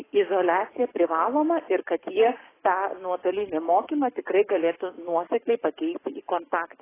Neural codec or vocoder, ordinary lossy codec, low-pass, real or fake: codec, 44.1 kHz, 7.8 kbps, DAC; AAC, 16 kbps; 3.6 kHz; fake